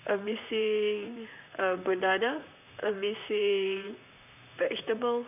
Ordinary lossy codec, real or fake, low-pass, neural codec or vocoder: none; fake; 3.6 kHz; vocoder, 44.1 kHz, 128 mel bands, Pupu-Vocoder